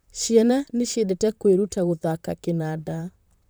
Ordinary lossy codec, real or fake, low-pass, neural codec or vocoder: none; fake; none; vocoder, 44.1 kHz, 128 mel bands, Pupu-Vocoder